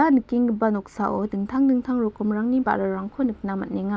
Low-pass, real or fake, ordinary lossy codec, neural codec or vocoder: none; real; none; none